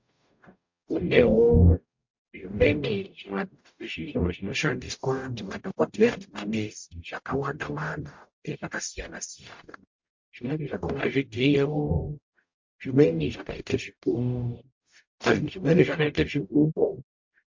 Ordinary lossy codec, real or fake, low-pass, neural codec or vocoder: MP3, 48 kbps; fake; 7.2 kHz; codec, 44.1 kHz, 0.9 kbps, DAC